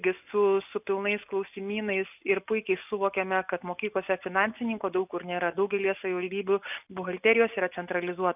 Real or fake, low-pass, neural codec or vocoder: real; 3.6 kHz; none